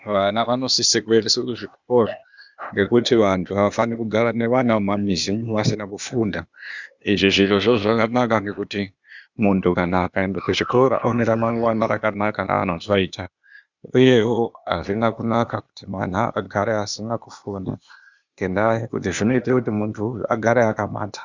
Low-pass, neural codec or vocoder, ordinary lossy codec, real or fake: 7.2 kHz; codec, 16 kHz, 0.8 kbps, ZipCodec; Opus, 64 kbps; fake